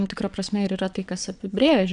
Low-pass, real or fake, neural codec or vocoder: 9.9 kHz; fake; vocoder, 22.05 kHz, 80 mel bands, WaveNeXt